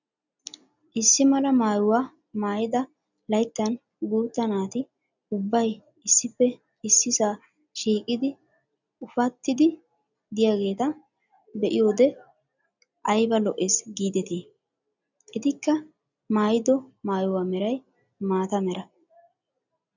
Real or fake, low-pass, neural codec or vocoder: real; 7.2 kHz; none